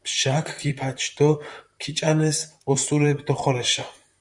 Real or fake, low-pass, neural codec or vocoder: fake; 10.8 kHz; vocoder, 44.1 kHz, 128 mel bands, Pupu-Vocoder